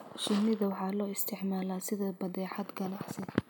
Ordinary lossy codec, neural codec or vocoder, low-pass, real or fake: none; none; none; real